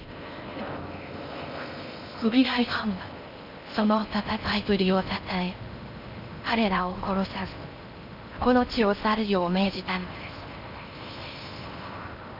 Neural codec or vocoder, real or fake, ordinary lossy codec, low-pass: codec, 16 kHz in and 24 kHz out, 0.6 kbps, FocalCodec, streaming, 4096 codes; fake; none; 5.4 kHz